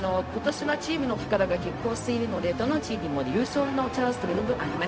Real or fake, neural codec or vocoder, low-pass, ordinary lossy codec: fake; codec, 16 kHz, 0.4 kbps, LongCat-Audio-Codec; none; none